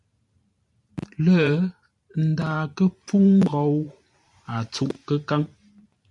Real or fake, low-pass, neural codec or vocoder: fake; 10.8 kHz; vocoder, 44.1 kHz, 128 mel bands every 512 samples, BigVGAN v2